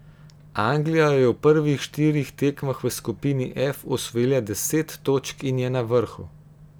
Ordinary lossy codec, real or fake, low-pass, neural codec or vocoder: none; real; none; none